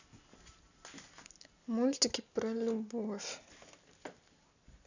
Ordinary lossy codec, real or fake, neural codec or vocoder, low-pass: none; real; none; 7.2 kHz